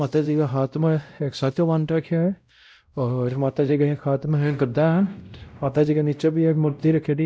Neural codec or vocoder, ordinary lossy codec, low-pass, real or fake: codec, 16 kHz, 0.5 kbps, X-Codec, WavLM features, trained on Multilingual LibriSpeech; none; none; fake